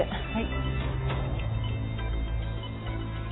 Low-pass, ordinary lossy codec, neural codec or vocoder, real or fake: 7.2 kHz; AAC, 16 kbps; none; real